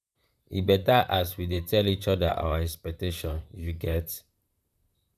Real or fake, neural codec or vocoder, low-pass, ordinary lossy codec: fake; vocoder, 44.1 kHz, 128 mel bands, Pupu-Vocoder; 14.4 kHz; none